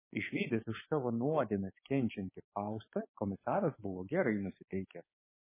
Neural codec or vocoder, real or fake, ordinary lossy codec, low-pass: codec, 16 kHz, 6 kbps, DAC; fake; MP3, 16 kbps; 3.6 kHz